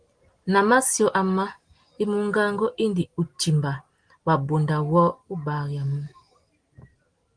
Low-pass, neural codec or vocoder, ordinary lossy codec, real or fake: 9.9 kHz; none; Opus, 24 kbps; real